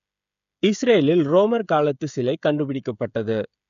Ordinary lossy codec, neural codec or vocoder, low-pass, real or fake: none; codec, 16 kHz, 16 kbps, FreqCodec, smaller model; 7.2 kHz; fake